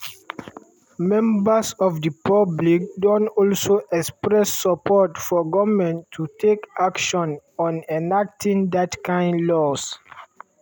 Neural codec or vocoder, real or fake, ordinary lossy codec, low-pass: none; real; none; none